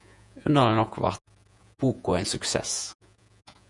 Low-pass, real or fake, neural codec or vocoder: 10.8 kHz; fake; vocoder, 48 kHz, 128 mel bands, Vocos